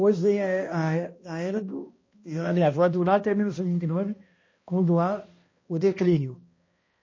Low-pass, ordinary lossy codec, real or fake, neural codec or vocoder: 7.2 kHz; MP3, 32 kbps; fake; codec, 16 kHz, 1 kbps, X-Codec, HuBERT features, trained on balanced general audio